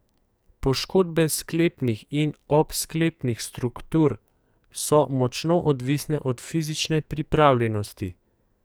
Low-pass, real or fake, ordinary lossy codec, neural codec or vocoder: none; fake; none; codec, 44.1 kHz, 2.6 kbps, SNAC